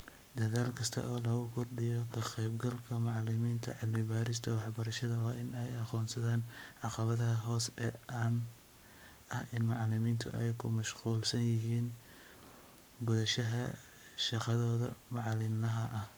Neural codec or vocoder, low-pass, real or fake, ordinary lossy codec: codec, 44.1 kHz, 7.8 kbps, Pupu-Codec; none; fake; none